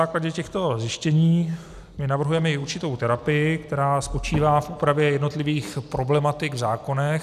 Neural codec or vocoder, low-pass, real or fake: none; 14.4 kHz; real